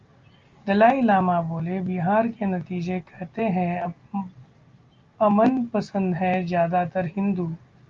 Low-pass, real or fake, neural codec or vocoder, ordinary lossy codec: 7.2 kHz; real; none; Opus, 32 kbps